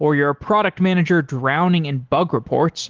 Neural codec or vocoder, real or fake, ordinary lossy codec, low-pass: none; real; Opus, 16 kbps; 7.2 kHz